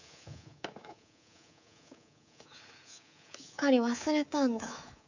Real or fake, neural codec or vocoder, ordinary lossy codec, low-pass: fake; codec, 24 kHz, 3.1 kbps, DualCodec; none; 7.2 kHz